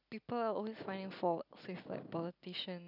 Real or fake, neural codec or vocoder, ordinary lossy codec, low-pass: real; none; none; 5.4 kHz